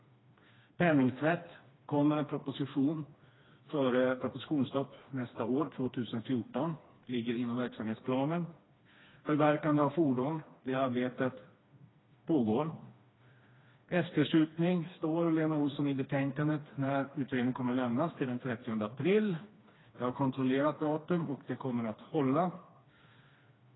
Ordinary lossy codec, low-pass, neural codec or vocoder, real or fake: AAC, 16 kbps; 7.2 kHz; codec, 16 kHz, 2 kbps, FreqCodec, smaller model; fake